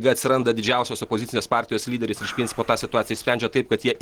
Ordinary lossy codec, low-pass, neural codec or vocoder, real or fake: Opus, 16 kbps; 19.8 kHz; none; real